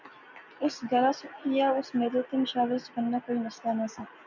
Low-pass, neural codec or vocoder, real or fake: 7.2 kHz; none; real